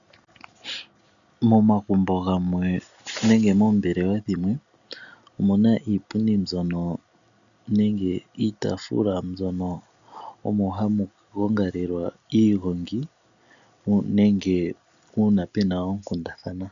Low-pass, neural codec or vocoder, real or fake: 7.2 kHz; none; real